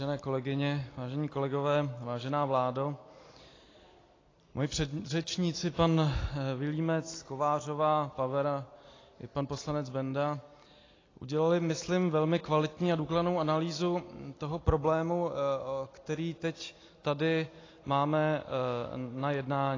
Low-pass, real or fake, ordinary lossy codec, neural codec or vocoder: 7.2 kHz; real; AAC, 32 kbps; none